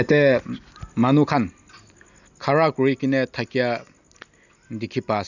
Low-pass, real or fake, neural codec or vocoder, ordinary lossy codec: 7.2 kHz; real; none; none